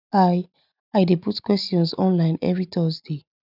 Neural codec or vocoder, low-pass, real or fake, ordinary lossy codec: none; 5.4 kHz; real; none